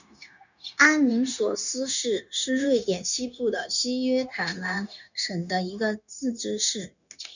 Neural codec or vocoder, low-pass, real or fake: codec, 16 kHz, 0.9 kbps, LongCat-Audio-Codec; 7.2 kHz; fake